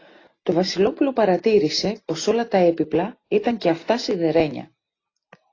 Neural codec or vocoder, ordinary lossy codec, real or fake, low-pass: none; AAC, 32 kbps; real; 7.2 kHz